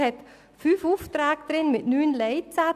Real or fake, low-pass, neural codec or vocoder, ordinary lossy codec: real; 14.4 kHz; none; none